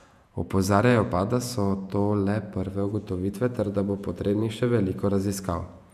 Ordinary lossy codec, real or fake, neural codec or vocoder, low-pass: none; fake; vocoder, 48 kHz, 128 mel bands, Vocos; 14.4 kHz